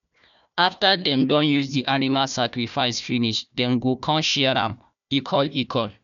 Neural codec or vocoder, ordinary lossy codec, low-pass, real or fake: codec, 16 kHz, 1 kbps, FunCodec, trained on Chinese and English, 50 frames a second; none; 7.2 kHz; fake